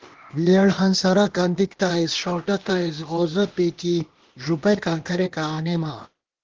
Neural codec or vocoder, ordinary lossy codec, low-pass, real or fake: codec, 16 kHz, 0.8 kbps, ZipCodec; Opus, 16 kbps; 7.2 kHz; fake